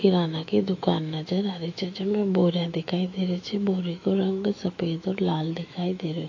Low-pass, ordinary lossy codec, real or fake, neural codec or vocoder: 7.2 kHz; AAC, 48 kbps; fake; autoencoder, 48 kHz, 128 numbers a frame, DAC-VAE, trained on Japanese speech